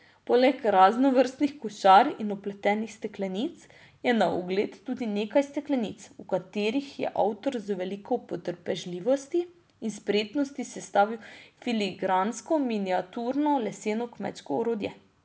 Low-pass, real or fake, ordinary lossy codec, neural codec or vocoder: none; real; none; none